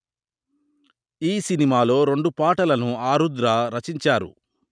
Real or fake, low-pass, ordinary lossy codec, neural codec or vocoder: real; 9.9 kHz; none; none